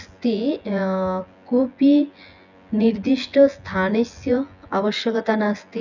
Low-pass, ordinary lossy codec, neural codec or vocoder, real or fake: 7.2 kHz; none; vocoder, 24 kHz, 100 mel bands, Vocos; fake